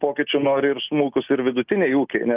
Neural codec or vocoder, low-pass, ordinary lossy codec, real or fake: none; 3.6 kHz; Opus, 24 kbps; real